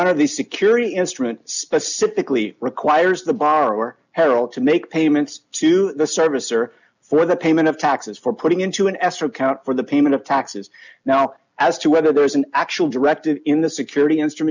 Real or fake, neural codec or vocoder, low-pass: real; none; 7.2 kHz